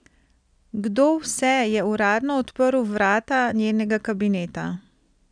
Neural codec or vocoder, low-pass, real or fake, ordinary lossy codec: none; 9.9 kHz; real; none